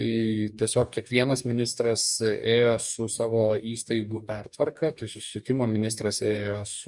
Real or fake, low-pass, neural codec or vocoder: fake; 10.8 kHz; codec, 44.1 kHz, 2.6 kbps, DAC